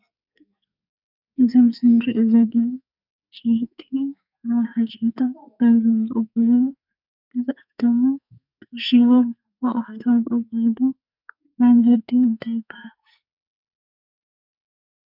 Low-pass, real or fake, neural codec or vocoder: 5.4 kHz; fake; codec, 44.1 kHz, 2.6 kbps, SNAC